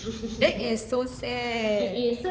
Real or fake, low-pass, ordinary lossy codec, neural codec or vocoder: fake; none; none; codec, 16 kHz, 4 kbps, X-Codec, HuBERT features, trained on balanced general audio